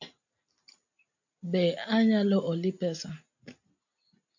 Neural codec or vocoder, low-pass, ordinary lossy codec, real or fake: none; 7.2 kHz; MP3, 48 kbps; real